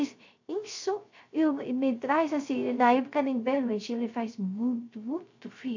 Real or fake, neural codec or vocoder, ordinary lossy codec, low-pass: fake; codec, 16 kHz, 0.3 kbps, FocalCodec; AAC, 48 kbps; 7.2 kHz